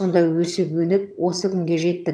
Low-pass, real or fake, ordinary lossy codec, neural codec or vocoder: none; fake; none; vocoder, 22.05 kHz, 80 mel bands, HiFi-GAN